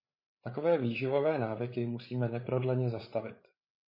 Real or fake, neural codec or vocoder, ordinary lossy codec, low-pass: fake; codec, 16 kHz, 8 kbps, FreqCodec, larger model; MP3, 24 kbps; 5.4 kHz